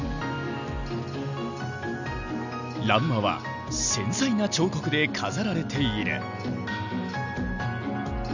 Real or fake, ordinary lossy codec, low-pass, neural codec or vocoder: real; none; 7.2 kHz; none